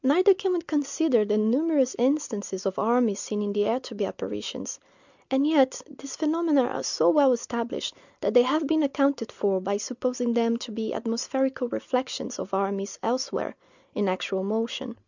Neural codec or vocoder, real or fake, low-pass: none; real; 7.2 kHz